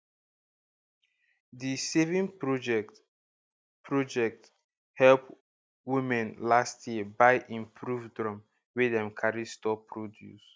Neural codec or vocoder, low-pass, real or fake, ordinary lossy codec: none; none; real; none